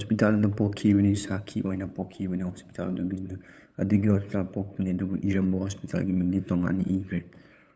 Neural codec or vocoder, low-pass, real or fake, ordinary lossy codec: codec, 16 kHz, 8 kbps, FunCodec, trained on LibriTTS, 25 frames a second; none; fake; none